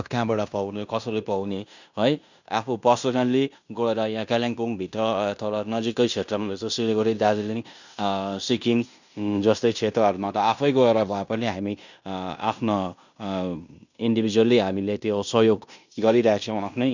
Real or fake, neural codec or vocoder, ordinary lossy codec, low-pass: fake; codec, 16 kHz in and 24 kHz out, 0.9 kbps, LongCat-Audio-Codec, fine tuned four codebook decoder; none; 7.2 kHz